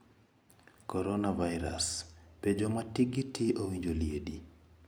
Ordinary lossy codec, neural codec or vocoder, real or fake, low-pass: none; none; real; none